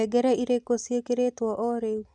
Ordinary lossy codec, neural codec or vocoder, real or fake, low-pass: none; none; real; 10.8 kHz